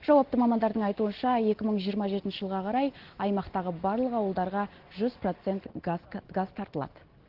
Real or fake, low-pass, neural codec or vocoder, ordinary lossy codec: real; 5.4 kHz; none; Opus, 16 kbps